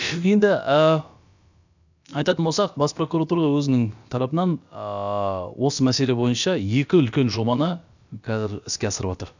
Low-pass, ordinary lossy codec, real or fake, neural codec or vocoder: 7.2 kHz; none; fake; codec, 16 kHz, about 1 kbps, DyCAST, with the encoder's durations